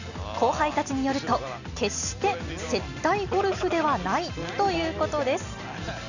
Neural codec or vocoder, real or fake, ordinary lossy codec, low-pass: none; real; none; 7.2 kHz